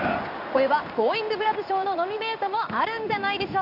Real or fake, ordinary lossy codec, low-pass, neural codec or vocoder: fake; none; 5.4 kHz; codec, 16 kHz in and 24 kHz out, 1 kbps, XY-Tokenizer